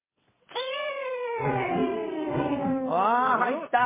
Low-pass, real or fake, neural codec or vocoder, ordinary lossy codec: 3.6 kHz; fake; vocoder, 22.05 kHz, 80 mel bands, WaveNeXt; MP3, 16 kbps